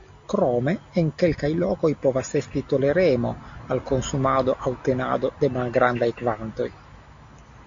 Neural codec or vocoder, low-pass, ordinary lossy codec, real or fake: none; 7.2 kHz; MP3, 48 kbps; real